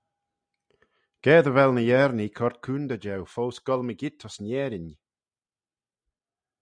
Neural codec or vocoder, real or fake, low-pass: none; real; 9.9 kHz